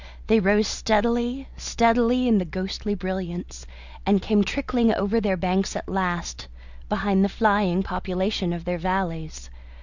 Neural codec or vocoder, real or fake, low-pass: none; real; 7.2 kHz